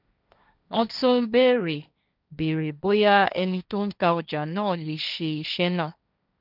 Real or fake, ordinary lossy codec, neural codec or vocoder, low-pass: fake; none; codec, 16 kHz, 1.1 kbps, Voila-Tokenizer; 5.4 kHz